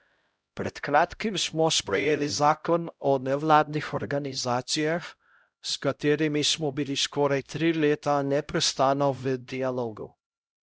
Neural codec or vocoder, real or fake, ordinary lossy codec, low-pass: codec, 16 kHz, 0.5 kbps, X-Codec, HuBERT features, trained on LibriSpeech; fake; none; none